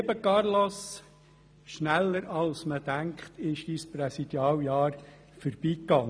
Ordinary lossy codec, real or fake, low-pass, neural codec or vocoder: none; real; none; none